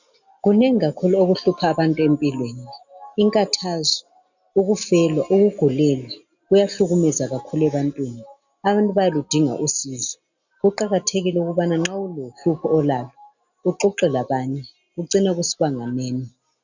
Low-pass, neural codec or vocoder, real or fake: 7.2 kHz; none; real